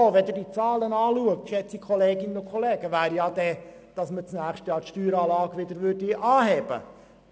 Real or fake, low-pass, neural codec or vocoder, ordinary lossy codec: real; none; none; none